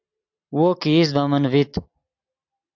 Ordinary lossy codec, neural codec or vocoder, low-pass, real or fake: AAC, 48 kbps; none; 7.2 kHz; real